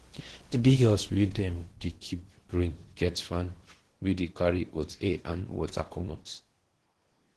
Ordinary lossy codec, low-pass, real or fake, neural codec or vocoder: Opus, 16 kbps; 10.8 kHz; fake; codec, 16 kHz in and 24 kHz out, 0.8 kbps, FocalCodec, streaming, 65536 codes